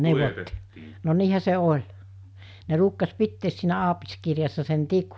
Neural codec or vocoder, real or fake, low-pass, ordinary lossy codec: none; real; none; none